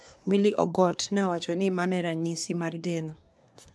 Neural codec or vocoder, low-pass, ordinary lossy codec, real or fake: codec, 24 kHz, 1 kbps, SNAC; none; none; fake